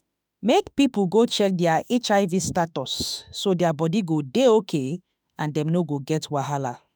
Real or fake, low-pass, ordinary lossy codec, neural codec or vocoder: fake; none; none; autoencoder, 48 kHz, 32 numbers a frame, DAC-VAE, trained on Japanese speech